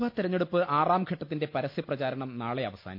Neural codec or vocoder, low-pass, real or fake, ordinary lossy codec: none; 5.4 kHz; real; none